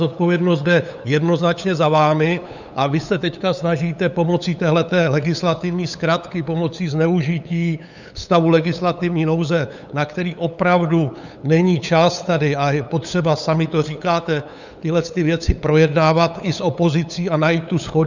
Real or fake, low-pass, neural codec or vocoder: fake; 7.2 kHz; codec, 16 kHz, 8 kbps, FunCodec, trained on LibriTTS, 25 frames a second